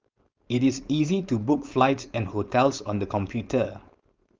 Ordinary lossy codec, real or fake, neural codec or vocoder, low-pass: Opus, 24 kbps; fake; codec, 16 kHz, 4.8 kbps, FACodec; 7.2 kHz